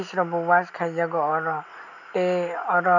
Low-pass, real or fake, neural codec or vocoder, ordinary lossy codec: 7.2 kHz; real; none; none